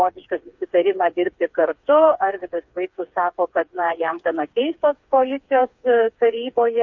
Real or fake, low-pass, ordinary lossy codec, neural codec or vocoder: fake; 7.2 kHz; MP3, 48 kbps; codec, 16 kHz, 4 kbps, FreqCodec, smaller model